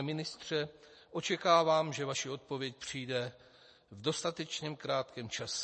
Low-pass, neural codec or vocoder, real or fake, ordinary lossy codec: 10.8 kHz; none; real; MP3, 32 kbps